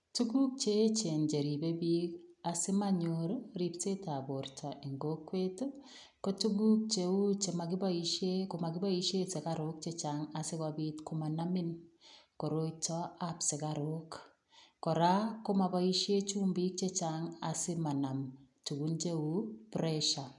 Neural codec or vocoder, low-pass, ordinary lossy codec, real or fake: none; 10.8 kHz; none; real